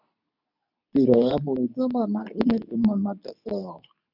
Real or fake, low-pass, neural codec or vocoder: fake; 5.4 kHz; codec, 24 kHz, 0.9 kbps, WavTokenizer, medium speech release version 2